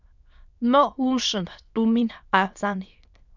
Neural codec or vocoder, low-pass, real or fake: autoencoder, 22.05 kHz, a latent of 192 numbers a frame, VITS, trained on many speakers; 7.2 kHz; fake